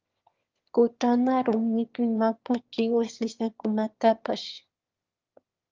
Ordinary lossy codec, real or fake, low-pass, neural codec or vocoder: Opus, 32 kbps; fake; 7.2 kHz; autoencoder, 22.05 kHz, a latent of 192 numbers a frame, VITS, trained on one speaker